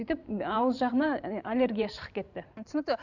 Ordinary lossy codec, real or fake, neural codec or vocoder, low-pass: none; fake; vocoder, 44.1 kHz, 128 mel bands every 512 samples, BigVGAN v2; 7.2 kHz